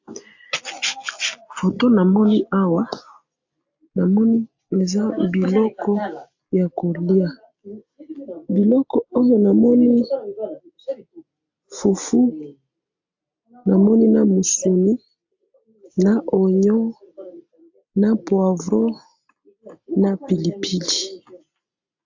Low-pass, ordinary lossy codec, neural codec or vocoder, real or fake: 7.2 kHz; AAC, 48 kbps; none; real